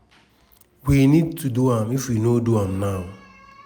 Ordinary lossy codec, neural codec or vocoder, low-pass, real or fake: none; none; none; real